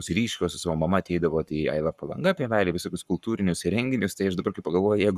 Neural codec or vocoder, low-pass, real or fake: codec, 44.1 kHz, 7.8 kbps, Pupu-Codec; 14.4 kHz; fake